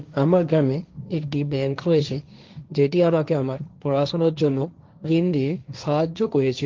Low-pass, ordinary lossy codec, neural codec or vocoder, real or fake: 7.2 kHz; Opus, 32 kbps; codec, 16 kHz, 1.1 kbps, Voila-Tokenizer; fake